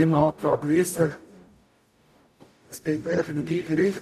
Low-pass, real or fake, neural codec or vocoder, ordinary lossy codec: 14.4 kHz; fake; codec, 44.1 kHz, 0.9 kbps, DAC; none